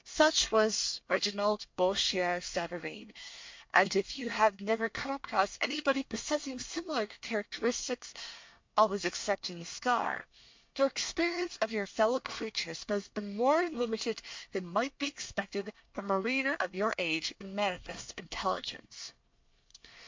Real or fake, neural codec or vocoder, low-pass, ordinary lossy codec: fake; codec, 24 kHz, 1 kbps, SNAC; 7.2 kHz; MP3, 48 kbps